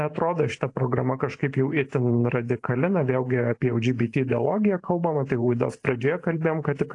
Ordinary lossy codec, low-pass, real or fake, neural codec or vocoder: AAC, 48 kbps; 10.8 kHz; fake; vocoder, 44.1 kHz, 128 mel bands every 512 samples, BigVGAN v2